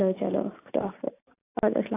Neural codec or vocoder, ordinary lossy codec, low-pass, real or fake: none; AAC, 16 kbps; 3.6 kHz; real